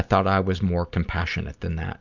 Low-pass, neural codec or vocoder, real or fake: 7.2 kHz; none; real